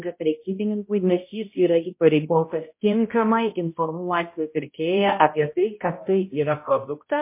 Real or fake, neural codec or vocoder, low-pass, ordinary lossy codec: fake; codec, 16 kHz, 0.5 kbps, X-Codec, HuBERT features, trained on balanced general audio; 3.6 kHz; MP3, 24 kbps